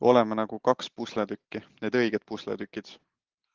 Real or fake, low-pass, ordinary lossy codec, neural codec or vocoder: real; 7.2 kHz; Opus, 16 kbps; none